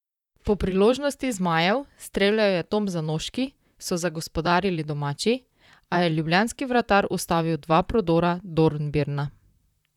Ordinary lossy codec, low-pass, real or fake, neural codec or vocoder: none; 19.8 kHz; fake; vocoder, 44.1 kHz, 128 mel bands, Pupu-Vocoder